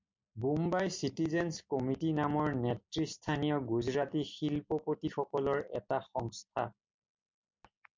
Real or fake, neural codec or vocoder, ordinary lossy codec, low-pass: real; none; MP3, 64 kbps; 7.2 kHz